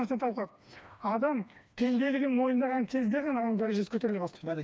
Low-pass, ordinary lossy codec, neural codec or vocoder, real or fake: none; none; codec, 16 kHz, 2 kbps, FreqCodec, smaller model; fake